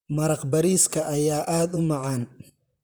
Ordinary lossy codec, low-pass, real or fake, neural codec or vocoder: none; none; fake; vocoder, 44.1 kHz, 128 mel bands, Pupu-Vocoder